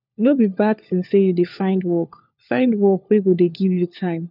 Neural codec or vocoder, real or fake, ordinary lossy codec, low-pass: codec, 16 kHz, 4 kbps, FunCodec, trained on LibriTTS, 50 frames a second; fake; none; 5.4 kHz